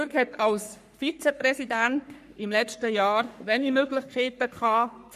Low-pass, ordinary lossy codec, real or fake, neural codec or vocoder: 14.4 kHz; MP3, 64 kbps; fake; codec, 44.1 kHz, 3.4 kbps, Pupu-Codec